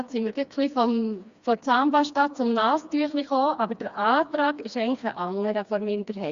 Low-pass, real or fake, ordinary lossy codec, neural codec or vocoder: 7.2 kHz; fake; Opus, 64 kbps; codec, 16 kHz, 2 kbps, FreqCodec, smaller model